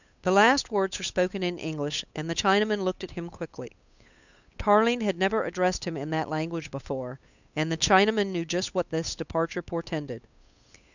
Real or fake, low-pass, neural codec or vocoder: fake; 7.2 kHz; codec, 16 kHz, 8 kbps, FunCodec, trained on Chinese and English, 25 frames a second